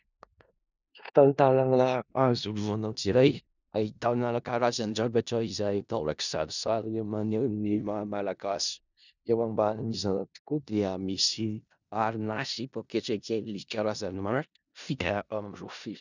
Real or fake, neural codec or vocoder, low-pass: fake; codec, 16 kHz in and 24 kHz out, 0.4 kbps, LongCat-Audio-Codec, four codebook decoder; 7.2 kHz